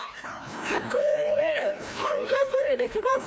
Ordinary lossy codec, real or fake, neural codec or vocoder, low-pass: none; fake; codec, 16 kHz, 1 kbps, FreqCodec, larger model; none